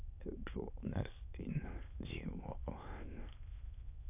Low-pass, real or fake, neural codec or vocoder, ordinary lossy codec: 3.6 kHz; fake; autoencoder, 22.05 kHz, a latent of 192 numbers a frame, VITS, trained on many speakers; none